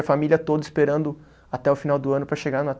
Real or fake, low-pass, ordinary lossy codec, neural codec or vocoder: real; none; none; none